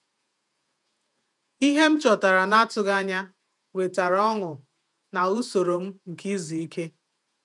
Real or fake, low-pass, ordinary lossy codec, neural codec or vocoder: fake; 10.8 kHz; none; vocoder, 48 kHz, 128 mel bands, Vocos